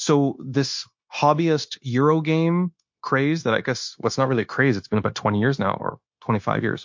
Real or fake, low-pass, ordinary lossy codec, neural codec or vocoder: fake; 7.2 kHz; MP3, 48 kbps; codec, 16 kHz, 0.9 kbps, LongCat-Audio-Codec